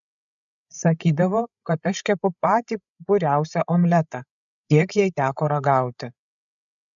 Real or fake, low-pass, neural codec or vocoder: fake; 7.2 kHz; codec, 16 kHz, 8 kbps, FreqCodec, larger model